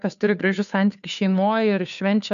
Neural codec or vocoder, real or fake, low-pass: codec, 16 kHz, 2 kbps, FunCodec, trained on Chinese and English, 25 frames a second; fake; 7.2 kHz